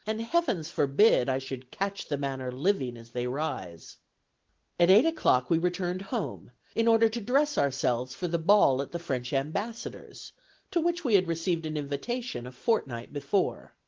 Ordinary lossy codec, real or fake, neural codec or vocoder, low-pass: Opus, 16 kbps; real; none; 7.2 kHz